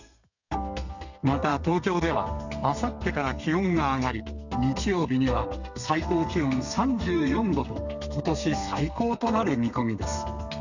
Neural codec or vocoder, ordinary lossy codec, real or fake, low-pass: codec, 32 kHz, 1.9 kbps, SNAC; none; fake; 7.2 kHz